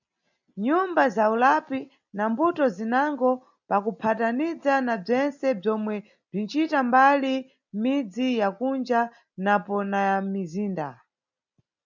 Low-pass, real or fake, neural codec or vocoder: 7.2 kHz; real; none